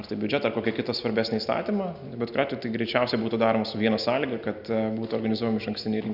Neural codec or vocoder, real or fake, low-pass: none; real; 5.4 kHz